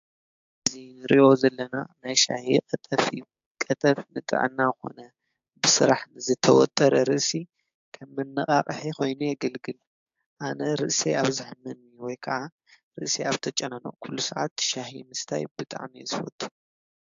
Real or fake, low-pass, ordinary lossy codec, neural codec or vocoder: fake; 7.2 kHz; AAC, 64 kbps; codec, 16 kHz, 6 kbps, DAC